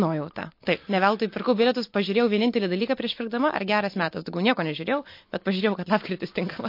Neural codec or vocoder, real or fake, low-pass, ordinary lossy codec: none; real; 5.4 kHz; MP3, 32 kbps